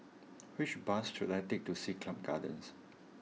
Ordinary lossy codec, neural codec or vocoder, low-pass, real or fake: none; none; none; real